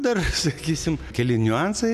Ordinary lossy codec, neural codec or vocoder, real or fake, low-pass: MP3, 96 kbps; none; real; 14.4 kHz